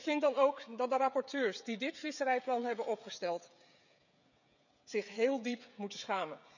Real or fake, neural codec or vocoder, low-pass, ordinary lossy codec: fake; codec, 16 kHz, 16 kbps, FreqCodec, smaller model; 7.2 kHz; none